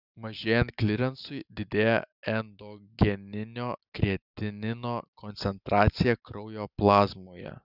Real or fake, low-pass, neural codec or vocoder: real; 5.4 kHz; none